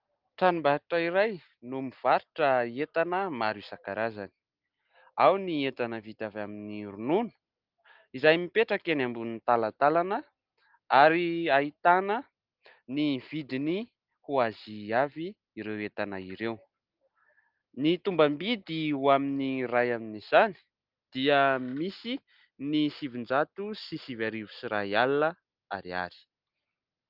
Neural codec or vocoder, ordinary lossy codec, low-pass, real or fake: none; Opus, 24 kbps; 5.4 kHz; real